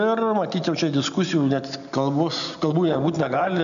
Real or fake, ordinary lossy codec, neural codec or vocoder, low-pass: real; AAC, 96 kbps; none; 7.2 kHz